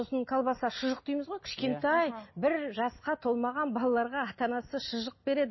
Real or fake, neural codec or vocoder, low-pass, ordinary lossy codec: real; none; 7.2 kHz; MP3, 24 kbps